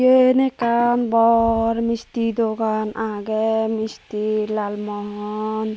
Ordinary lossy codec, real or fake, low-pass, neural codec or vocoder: none; real; none; none